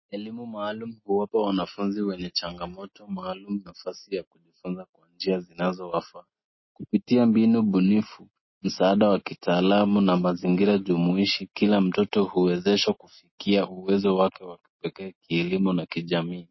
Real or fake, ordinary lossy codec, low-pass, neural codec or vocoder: real; MP3, 24 kbps; 7.2 kHz; none